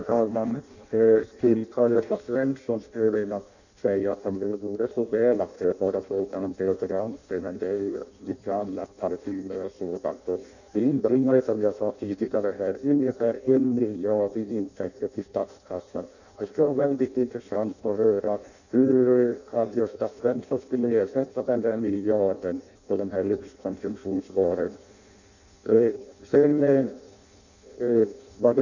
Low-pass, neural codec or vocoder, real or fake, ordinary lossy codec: 7.2 kHz; codec, 16 kHz in and 24 kHz out, 0.6 kbps, FireRedTTS-2 codec; fake; none